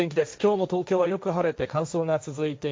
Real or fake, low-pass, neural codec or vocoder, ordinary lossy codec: fake; none; codec, 16 kHz, 1.1 kbps, Voila-Tokenizer; none